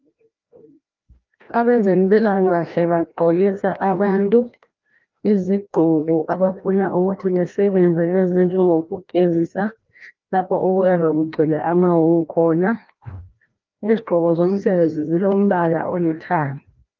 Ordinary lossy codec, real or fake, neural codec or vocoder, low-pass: Opus, 24 kbps; fake; codec, 16 kHz, 1 kbps, FreqCodec, larger model; 7.2 kHz